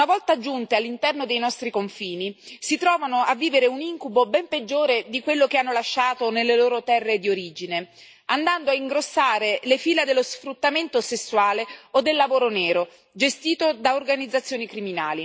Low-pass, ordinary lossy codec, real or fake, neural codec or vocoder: none; none; real; none